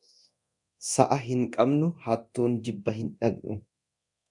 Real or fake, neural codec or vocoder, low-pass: fake; codec, 24 kHz, 0.9 kbps, DualCodec; 10.8 kHz